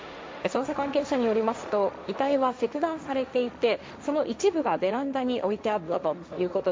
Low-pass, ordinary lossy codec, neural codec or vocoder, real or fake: none; none; codec, 16 kHz, 1.1 kbps, Voila-Tokenizer; fake